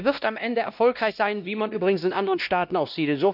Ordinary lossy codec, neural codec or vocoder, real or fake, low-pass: none; codec, 16 kHz, 1 kbps, X-Codec, WavLM features, trained on Multilingual LibriSpeech; fake; 5.4 kHz